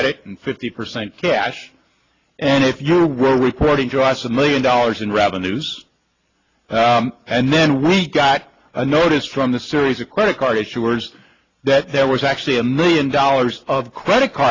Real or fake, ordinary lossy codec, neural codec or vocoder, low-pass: real; AAC, 32 kbps; none; 7.2 kHz